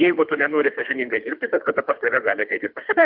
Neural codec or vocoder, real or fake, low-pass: codec, 24 kHz, 3 kbps, HILCodec; fake; 5.4 kHz